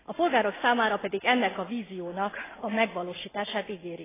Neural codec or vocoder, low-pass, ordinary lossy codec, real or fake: none; 3.6 kHz; AAC, 16 kbps; real